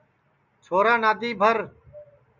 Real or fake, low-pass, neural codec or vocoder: real; 7.2 kHz; none